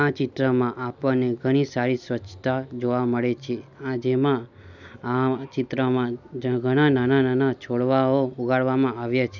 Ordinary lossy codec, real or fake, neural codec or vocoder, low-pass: none; real; none; 7.2 kHz